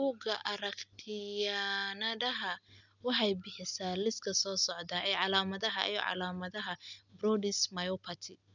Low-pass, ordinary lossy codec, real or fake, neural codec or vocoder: 7.2 kHz; none; real; none